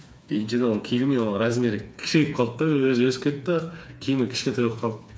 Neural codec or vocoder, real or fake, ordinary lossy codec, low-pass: codec, 16 kHz, 4 kbps, FreqCodec, smaller model; fake; none; none